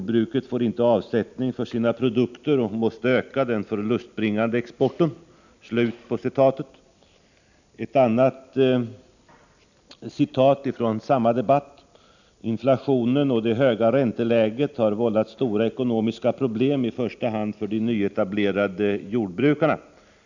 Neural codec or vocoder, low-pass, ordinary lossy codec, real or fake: none; 7.2 kHz; none; real